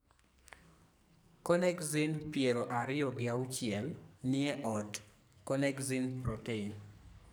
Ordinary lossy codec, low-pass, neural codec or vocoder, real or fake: none; none; codec, 44.1 kHz, 2.6 kbps, SNAC; fake